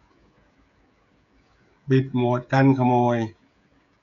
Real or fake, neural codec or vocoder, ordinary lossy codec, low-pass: fake; codec, 16 kHz, 16 kbps, FreqCodec, smaller model; none; 7.2 kHz